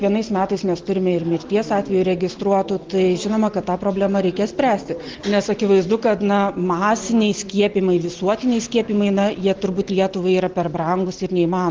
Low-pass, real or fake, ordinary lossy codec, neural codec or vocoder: 7.2 kHz; real; Opus, 16 kbps; none